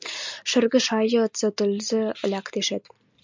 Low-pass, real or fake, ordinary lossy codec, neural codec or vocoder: 7.2 kHz; real; MP3, 64 kbps; none